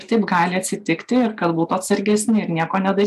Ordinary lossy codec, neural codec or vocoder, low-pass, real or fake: AAC, 96 kbps; none; 14.4 kHz; real